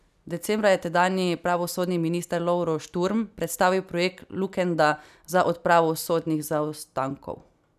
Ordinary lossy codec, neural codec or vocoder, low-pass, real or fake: none; none; 14.4 kHz; real